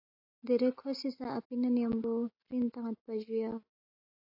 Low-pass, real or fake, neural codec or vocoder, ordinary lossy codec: 5.4 kHz; real; none; AAC, 48 kbps